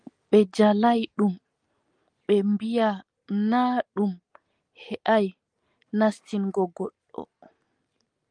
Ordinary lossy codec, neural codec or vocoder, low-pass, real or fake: Opus, 32 kbps; none; 9.9 kHz; real